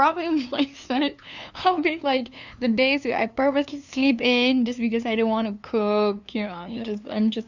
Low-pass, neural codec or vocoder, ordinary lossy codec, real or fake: 7.2 kHz; codec, 16 kHz, 2 kbps, FunCodec, trained on LibriTTS, 25 frames a second; none; fake